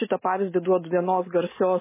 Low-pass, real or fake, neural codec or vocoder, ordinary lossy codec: 3.6 kHz; real; none; MP3, 16 kbps